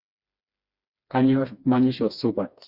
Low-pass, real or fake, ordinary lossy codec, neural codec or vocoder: 5.4 kHz; fake; Opus, 64 kbps; codec, 16 kHz, 2 kbps, FreqCodec, smaller model